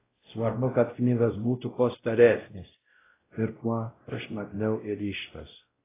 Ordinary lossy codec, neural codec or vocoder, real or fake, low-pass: AAC, 16 kbps; codec, 16 kHz, 0.5 kbps, X-Codec, WavLM features, trained on Multilingual LibriSpeech; fake; 3.6 kHz